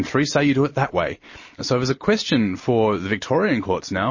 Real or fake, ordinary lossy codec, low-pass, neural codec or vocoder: real; MP3, 32 kbps; 7.2 kHz; none